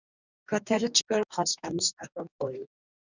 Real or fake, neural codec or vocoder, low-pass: fake; codec, 44.1 kHz, 2.6 kbps, DAC; 7.2 kHz